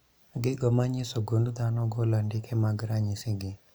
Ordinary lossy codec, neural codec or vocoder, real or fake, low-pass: none; none; real; none